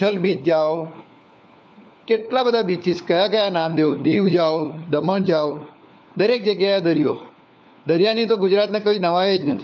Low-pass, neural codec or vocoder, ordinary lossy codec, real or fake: none; codec, 16 kHz, 4 kbps, FunCodec, trained on LibriTTS, 50 frames a second; none; fake